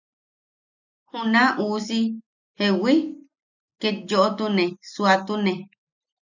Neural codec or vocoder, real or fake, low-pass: none; real; 7.2 kHz